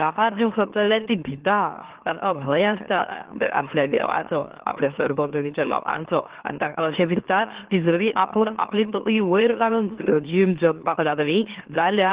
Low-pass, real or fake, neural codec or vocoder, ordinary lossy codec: 3.6 kHz; fake; autoencoder, 44.1 kHz, a latent of 192 numbers a frame, MeloTTS; Opus, 16 kbps